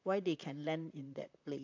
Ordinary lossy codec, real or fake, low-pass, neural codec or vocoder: AAC, 48 kbps; fake; 7.2 kHz; vocoder, 44.1 kHz, 128 mel bands, Pupu-Vocoder